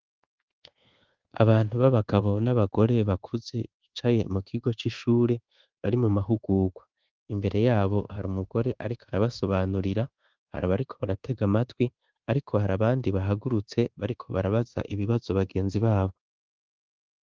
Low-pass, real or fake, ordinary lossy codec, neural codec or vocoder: 7.2 kHz; fake; Opus, 16 kbps; codec, 24 kHz, 1.2 kbps, DualCodec